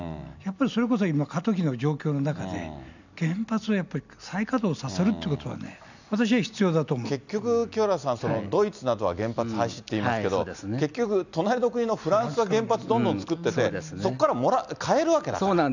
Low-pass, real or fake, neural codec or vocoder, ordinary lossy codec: 7.2 kHz; real; none; none